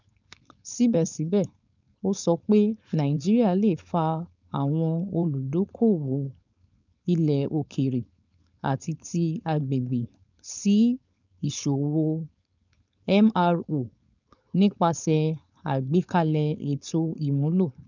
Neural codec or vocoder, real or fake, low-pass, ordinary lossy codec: codec, 16 kHz, 4.8 kbps, FACodec; fake; 7.2 kHz; none